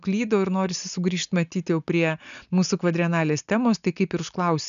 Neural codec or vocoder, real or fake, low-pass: none; real; 7.2 kHz